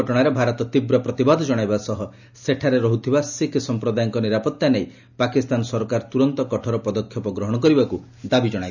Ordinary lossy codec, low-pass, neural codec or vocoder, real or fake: none; 7.2 kHz; none; real